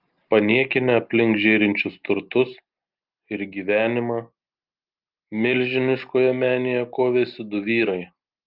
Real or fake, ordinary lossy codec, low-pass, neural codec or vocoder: real; Opus, 32 kbps; 5.4 kHz; none